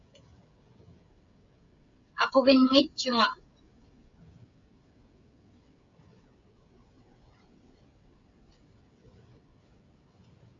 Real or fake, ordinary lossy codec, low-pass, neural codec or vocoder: fake; AAC, 48 kbps; 7.2 kHz; codec, 16 kHz, 16 kbps, FreqCodec, smaller model